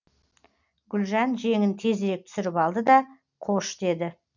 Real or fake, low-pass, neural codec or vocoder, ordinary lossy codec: real; 7.2 kHz; none; none